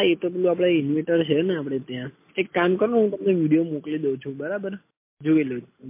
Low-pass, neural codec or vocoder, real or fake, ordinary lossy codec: 3.6 kHz; none; real; MP3, 24 kbps